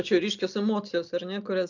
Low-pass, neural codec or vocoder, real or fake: 7.2 kHz; none; real